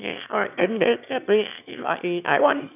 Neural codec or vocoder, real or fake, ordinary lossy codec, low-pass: autoencoder, 22.05 kHz, a latent of 192 numbers a frame, VITS, trained on one speaker; fake; none; 3.6 kHz